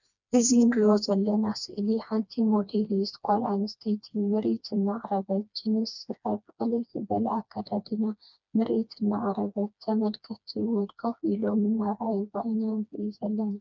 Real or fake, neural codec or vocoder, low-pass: fake; codec, 16 kHz, 2 kbps, FreqCodec, smaller model; 7.2 kHz